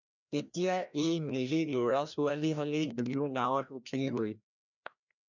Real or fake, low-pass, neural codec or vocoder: fake; 7.2 kHz; codec, 16 kHz, 1 kbps, FreqCodec, larger model